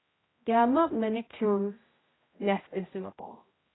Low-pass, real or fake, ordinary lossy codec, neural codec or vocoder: 7.2 kHz; fake; AAC, 16 kbps; codec, 16 kHz, 0.5 kbps, X-Codec, HuBERT features, trained on general audio